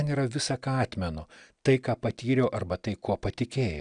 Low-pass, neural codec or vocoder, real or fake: 9.9 kHz; none; real